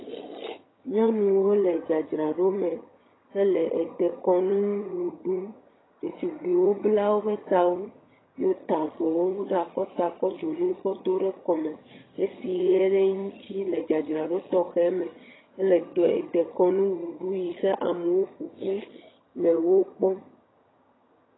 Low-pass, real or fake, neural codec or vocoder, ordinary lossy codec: 7.2 kHz; fake; vocoder, 22.05 kHz, 80 mel bands, HiFi-GAN; AAC, 16 kbps